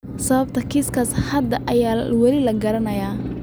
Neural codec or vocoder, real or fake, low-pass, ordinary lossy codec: none; real; none; none